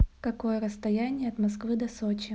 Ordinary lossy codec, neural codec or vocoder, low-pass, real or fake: none; none; none; real